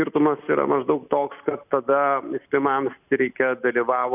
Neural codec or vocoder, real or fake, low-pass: none; real; 3.6 kHz